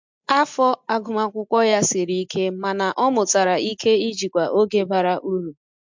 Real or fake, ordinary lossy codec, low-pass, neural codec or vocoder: real; MP3, 64 kbps; 7.2 kHz; none